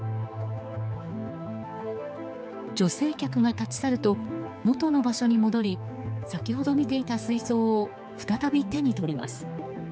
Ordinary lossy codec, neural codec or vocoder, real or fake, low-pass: none; codec, 16 kHz, 4 kbps, X-Codec, HuBERT features, trained on general audio; fake; none